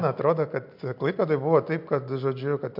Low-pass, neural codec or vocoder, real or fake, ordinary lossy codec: 5.4 kHz; none; real; MP3, 48 kbps